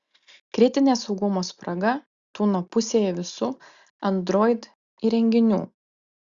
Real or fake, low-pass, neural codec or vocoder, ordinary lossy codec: real; 7.2 kHz; none; Opus, 64 kbps